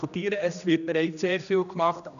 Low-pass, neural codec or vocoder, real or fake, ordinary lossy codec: 7.2 kHz; codec, 16 kHz, 1 kbps, X-Codec, HuBERT features, trained on general audio; fake; none